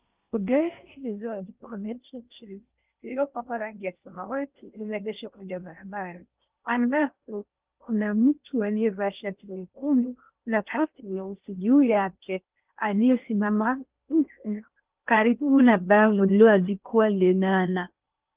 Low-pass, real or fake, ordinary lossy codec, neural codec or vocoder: 3.6 kHz; fake; Opus, 32 kbps; codec, 16 kHz in and 24 kHz out, 0.6 kbps, FocalCodec, streaming, 4096 codes